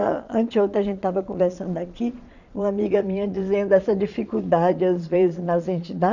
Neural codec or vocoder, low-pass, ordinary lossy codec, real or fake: vocoder, 22.05 kHz, 80 mel bands, Vocos; 7.2 kHz; none; fake